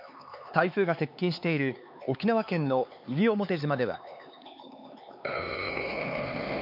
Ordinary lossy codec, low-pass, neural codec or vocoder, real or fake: MP3, 48 kbps; 5.4 kHz; codec, 16 kHz, 4 kbps, X-Codec, HuBERT features, trained on LibriSpeech; fake